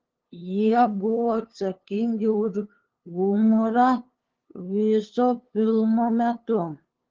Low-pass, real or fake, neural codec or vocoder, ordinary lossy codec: 7.2 kHz; fake; codec, 16 kHz, 2 kbps, FunCodec, trained on LibriTTS, 25 frames a second; Opus, 16 kbps